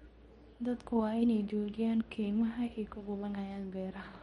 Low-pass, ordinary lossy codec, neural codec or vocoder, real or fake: 10.8 kHz; MP3, 48 kbps; codec, 24 kHz, 0.9 kbps, WavTokenizer, medium speech release version 2; fake